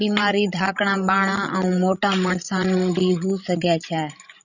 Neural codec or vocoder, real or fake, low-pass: codec, 16 kHz, 16 kbps, FreqCodec, larger model; fake; 7.2 kHz